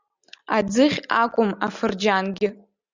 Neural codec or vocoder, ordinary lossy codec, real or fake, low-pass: none; Opus, 64 kbps; real; 7.2 kHz